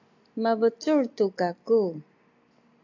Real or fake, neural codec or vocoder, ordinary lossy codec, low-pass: real; none; AAC, 48 kbps; 7.2 kHz